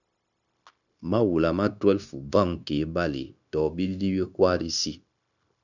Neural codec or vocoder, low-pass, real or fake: codec, 16 kHz, 0.9 kbps, LongCat-Audio-Codec; 7.2 kHz; fake